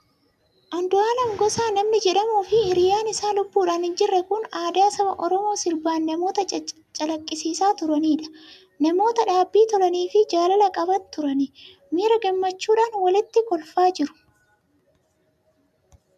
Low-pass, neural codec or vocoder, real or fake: 14.4 kHz; none; real